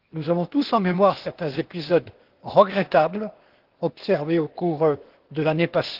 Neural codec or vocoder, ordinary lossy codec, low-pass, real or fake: codec, 16 kHz, 0.8 kbps, ZipCodec; Opus, 16 kbps; 5.4 kHz; fake